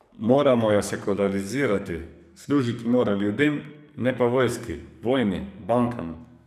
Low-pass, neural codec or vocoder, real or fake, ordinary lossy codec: 14.4 kHz; codec, 44.1 kHz, 2.6 kbps, SNAC; fake; none